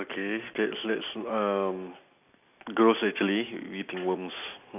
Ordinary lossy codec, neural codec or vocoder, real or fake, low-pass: none; none; real; 3.6 kHz